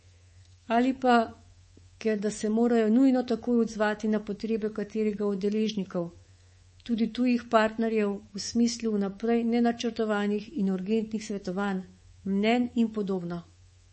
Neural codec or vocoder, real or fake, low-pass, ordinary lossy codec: codec, 24 kHz, 3.1 kbps, DualCodec; fake; 10.8 kHz; MP3, 32 kbps